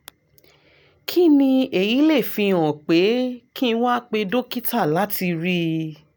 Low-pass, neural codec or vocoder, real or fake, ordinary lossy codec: none; none; real; none